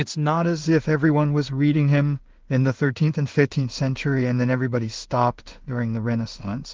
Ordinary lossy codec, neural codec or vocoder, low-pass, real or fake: Opus, 16 kbps; codec, 16 kHz in and 24 kHz out, 0.4 kbps, LongCat-Audio-Codec, two codebook decoder; 7.2 kHz; fake